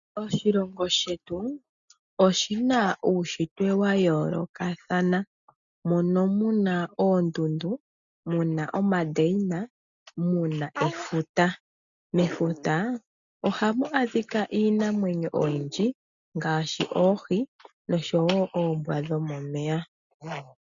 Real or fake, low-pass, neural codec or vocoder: real; 7.2 kHz; none